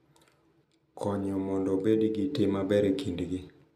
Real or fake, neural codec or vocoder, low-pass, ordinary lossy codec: real; none; 14.4 kHz; none